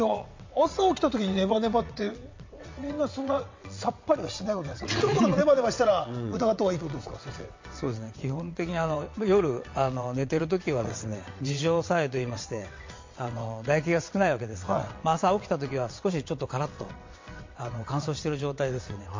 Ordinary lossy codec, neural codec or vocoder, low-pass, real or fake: MP3, 48 kbps; vocoder, 22.05 kHz, 80 mel bands, WaveNeXt; 7.2 kHz; fake